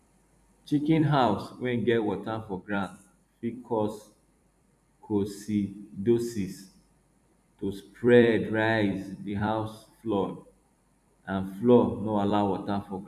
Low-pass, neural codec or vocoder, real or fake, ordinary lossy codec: 14.4 kHz; vocoder, 44.1 kHz, 128 mel bands every 256 samples, BigVGAN v2; fake; none